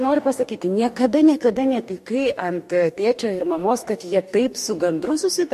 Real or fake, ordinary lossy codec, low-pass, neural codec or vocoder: fake; MP3, 64 kbps; 14.4 kHz; codec, 44.1 kHz, 2.6 kbps, DAC